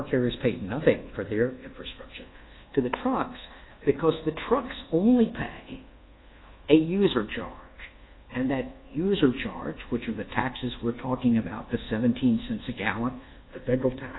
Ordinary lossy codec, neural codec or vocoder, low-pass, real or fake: AAC, 16 kbps; codec, 24 kHz, 1.2 kbps, DualCodec; 7.2 kHz; fake